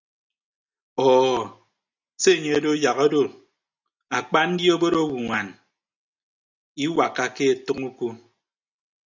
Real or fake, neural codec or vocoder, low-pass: real; none; 7.2 kHz